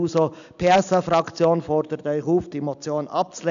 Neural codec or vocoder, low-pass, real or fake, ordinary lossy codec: none; 7.2 kHz; real; none